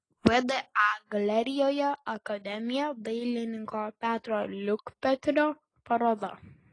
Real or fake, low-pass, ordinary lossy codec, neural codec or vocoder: real; 9.9 kHz; AAC, 32 kbps; none